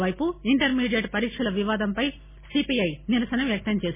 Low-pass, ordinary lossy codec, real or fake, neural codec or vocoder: 3.6 kHz; MP3, 16 kbps; real; none